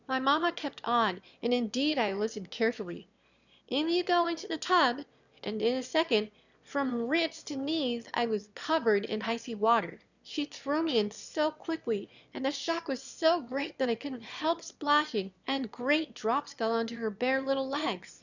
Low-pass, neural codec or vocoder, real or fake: 7.2 kHz; autoencoder, 22.05 kHz, a latent of 192 numbers a frame, VITS, trained on one speaker; fake